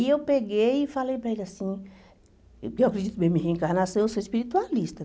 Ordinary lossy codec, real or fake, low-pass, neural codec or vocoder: none; real; none; none